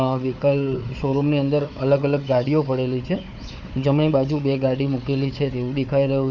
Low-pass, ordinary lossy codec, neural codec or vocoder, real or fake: 7.2 kHz; none; codec, 16 kHz, 4 kbps, FunCodec, trained on Chinese and English, 50 frames a second; fake